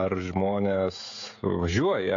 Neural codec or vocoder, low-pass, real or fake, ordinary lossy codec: codec, 16 kHz, 16 kbps, FreqCodec, smaller model; 7.2 kHz; fake; AAC, 48 kbps